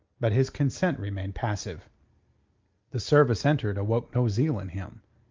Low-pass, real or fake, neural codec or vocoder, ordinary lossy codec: 7.2 kHz; real; none; Opus, 32 kbps